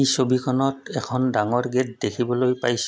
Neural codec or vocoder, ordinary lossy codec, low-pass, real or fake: none; none; none; real